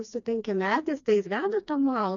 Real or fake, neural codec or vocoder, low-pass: fake; codec, 16 kHz, 2 kbps, FreqCodec, smaller model; 7.2 kHz